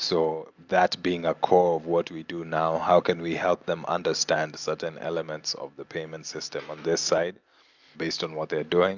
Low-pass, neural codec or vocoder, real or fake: 7.2 kHz; none; real